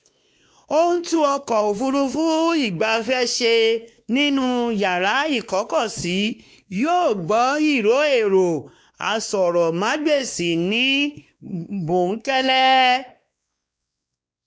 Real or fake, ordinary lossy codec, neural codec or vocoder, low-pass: fake; none; codec, 16 kHz, 2 kbps, X-Codec, WavLM features, trained on Multilingual LibriSpeech; none